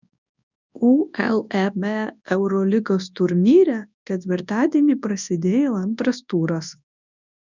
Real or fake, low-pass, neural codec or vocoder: fake; 7.2 kHz; codec, 24 kHz, 0.9 kbps, WavTokenizer, large speech release